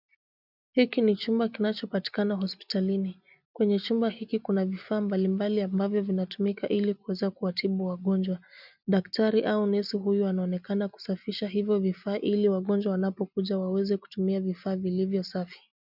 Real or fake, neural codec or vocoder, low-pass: real; none; 5.4 kHz